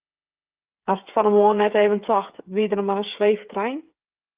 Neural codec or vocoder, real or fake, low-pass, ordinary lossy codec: codec, 16 kHz, 8 kbps, FreqCodec, smaller model; fake; 3.6 kHz; Opus, 24 kbps